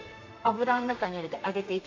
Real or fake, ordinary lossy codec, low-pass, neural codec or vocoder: fake; AAC, 48 kbps; 7.2 kHz; codec, 32 kHz, 1.9 kbps, SNAC